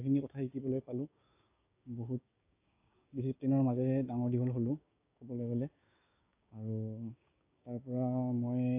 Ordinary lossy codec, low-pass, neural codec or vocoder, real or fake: AAC, 24 kbps; 3.6 kHz; codec, 16 kHz, 6 kbps, DAC; fake